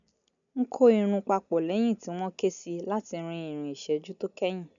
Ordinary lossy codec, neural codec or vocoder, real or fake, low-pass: none; none; real; 7.2 kHz